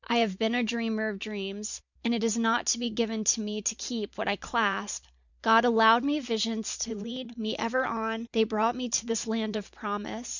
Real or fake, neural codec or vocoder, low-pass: fake; vocoder, 44.1 kHz, 128 mel bands every 512 samples, BigVGAN v2; 7.2 kHz